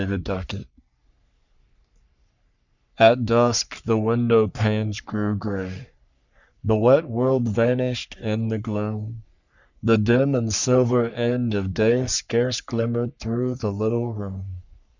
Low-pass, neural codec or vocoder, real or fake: 7.2 kHz; codec, 44.1 kHz, 3.4 kbps, Pupu-Codec; fake